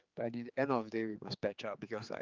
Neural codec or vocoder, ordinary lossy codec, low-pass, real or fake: codec, 16 kHz, 4 kbps, X-Codec, HuBERT features, trained on general audio; Opus, 32 kbps; 7.2 kHz; fake